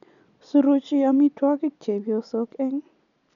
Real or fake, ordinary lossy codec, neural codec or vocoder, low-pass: real; none; none; 7.2 kHz